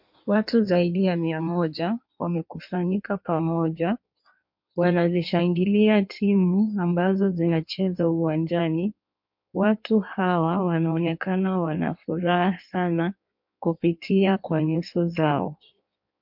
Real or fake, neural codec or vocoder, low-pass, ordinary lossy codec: fake; codec, 16 kHz in and 24 kHz out, 1.1 kbps, FireRedTTS-2 codec; 5.4 kHz; MP3, 48 kbps